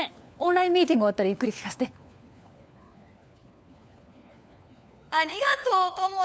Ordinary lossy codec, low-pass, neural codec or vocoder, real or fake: none; none; codec, 16 kHz, 4 kbps, FunCodec, trained on LibriTTS, 50 frames a second; fake